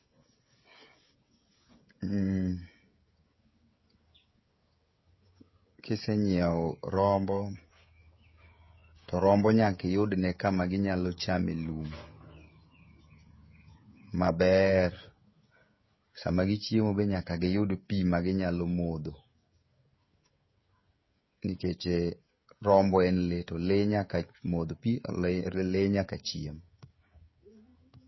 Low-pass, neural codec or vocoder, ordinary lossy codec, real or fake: 7.2 kHz; codec, 16 kHz, 16 kbps, FreqCodec, smaller model; MP3, 24 kbps; fake